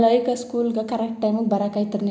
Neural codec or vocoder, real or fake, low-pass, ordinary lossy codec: none; real; none; none